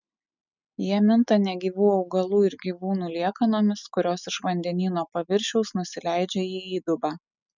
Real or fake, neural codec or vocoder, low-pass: real; none; 7.2 kHz